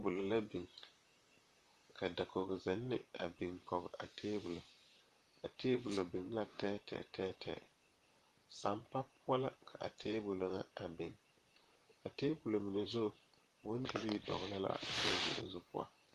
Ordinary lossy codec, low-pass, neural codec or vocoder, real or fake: Opus, 32 kbps; 14.4 kHz; vocoder, 44.1 kHz, 128 mel bands, Pupu-Vocoder; fake